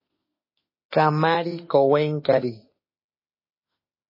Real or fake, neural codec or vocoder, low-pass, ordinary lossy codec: fake; autoencoder, 48 kHz, 32 numbers a frame, DAC-VAE, trained on Japanese speech; 5.4 kHz; MP3, 24 kbps